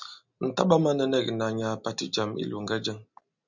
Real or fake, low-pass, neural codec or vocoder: real; 7.2 kHz; none